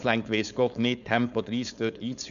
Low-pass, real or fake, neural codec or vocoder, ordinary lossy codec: 7.2 kHz; fake; codec, 16 kHz, 4.8 kbps, FACodec; none